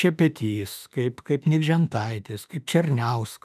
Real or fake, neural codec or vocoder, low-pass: fake; autoencoder, 48 kHz, 32 numbers a frame, DAC-VAE, trained on Japanese speech; 14.4 kHz